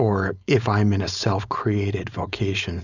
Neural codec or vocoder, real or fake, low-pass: codec, 16 kHz, 4.8 kbps, FACodec; fake; 7.2 kHz